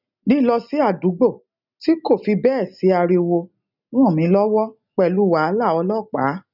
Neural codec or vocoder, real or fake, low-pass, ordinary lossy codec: none; real; 5.4 kHz; none